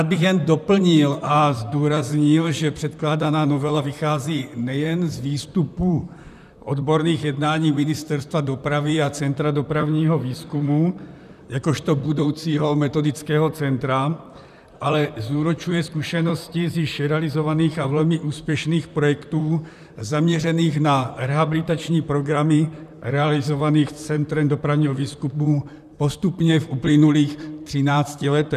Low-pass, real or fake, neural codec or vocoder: 14.4 kHz; fake; vocoder, 44.1 kHz, 128 mel bands, Pupu-Vocoder